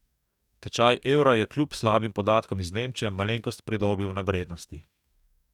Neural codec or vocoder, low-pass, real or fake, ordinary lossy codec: codec, 44.1 kHz, 2.6 kbps, DAC; 19.8 kHz; fake; none